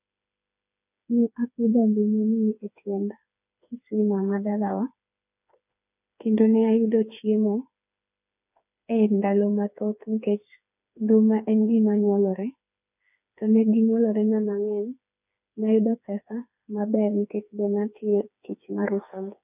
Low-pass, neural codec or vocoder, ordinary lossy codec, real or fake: 3.6 kHz; codec, 16 kHz, 4 kbps, FreqCodec, smaller model; none; fake